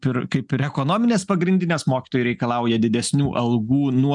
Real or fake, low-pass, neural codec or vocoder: real; 10.8 kHz; none